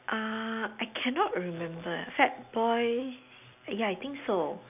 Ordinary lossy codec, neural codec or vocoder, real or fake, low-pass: none; none; real; 3.6 kHz